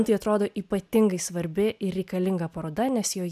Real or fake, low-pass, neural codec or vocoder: real; 14.4 kHz; none